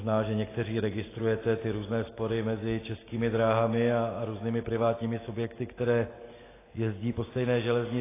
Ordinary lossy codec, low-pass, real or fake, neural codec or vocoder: AAC, 16 kbps; 3.6 kHz; real; none